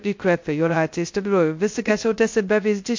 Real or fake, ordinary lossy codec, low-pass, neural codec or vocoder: fake; MP3, 48 kbps; 7.2 kHz; codec, 16 kHz, 0.2 kbps, FocalCodec